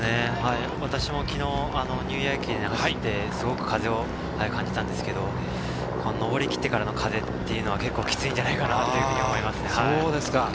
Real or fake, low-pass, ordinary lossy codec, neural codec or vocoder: real; none; none; none